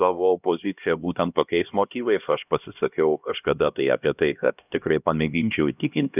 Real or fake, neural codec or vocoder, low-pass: fake; codec, 16 kHz, 1 kbps, X-Codec, HuBERT features, trained on LibriSpeech; 3.6 kHz